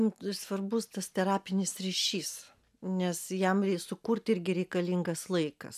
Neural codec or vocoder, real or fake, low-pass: none; real; 14.4 kHz